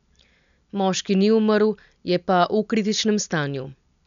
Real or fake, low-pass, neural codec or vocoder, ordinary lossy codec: real; 7.2 kHz; none; none